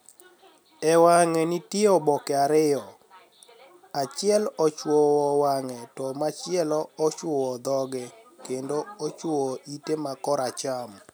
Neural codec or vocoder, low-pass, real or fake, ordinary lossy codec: none; none; real; none